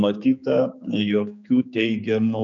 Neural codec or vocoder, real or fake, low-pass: codec, 16 kHz, 4 kbps, X-Codec, HuBERT features, trained on general audio; fake; 7.2 kHz